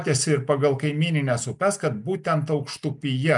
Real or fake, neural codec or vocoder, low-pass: real; none; 10.8 kHz